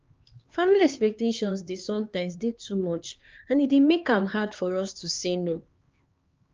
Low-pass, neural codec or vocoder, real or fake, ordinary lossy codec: 7.2 kHz; codec, 16 kHz, 2 kbps, X-Codec, HuBERT features, trained on LibriSpeech; fake; Opus, 24 kbps